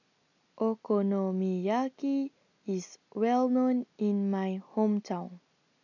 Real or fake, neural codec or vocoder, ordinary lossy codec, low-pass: real; none; none; 7.2 kHz